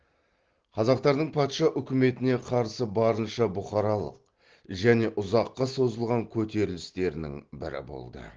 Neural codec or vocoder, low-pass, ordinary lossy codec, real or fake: none; 7.2 kHz; Opus, 16 kbps; real